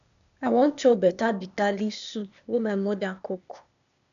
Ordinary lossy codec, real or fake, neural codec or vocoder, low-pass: none; fake; codec, 16 kHz, 0.8 kbps, ZipCodec; 7.2 kHz